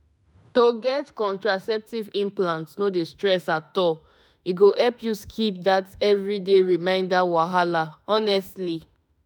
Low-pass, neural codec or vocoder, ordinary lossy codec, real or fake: none; autoencoder, 48 kHz, 32 numbers a frame, DAC-VAE, trained on Japanese speech; none; fake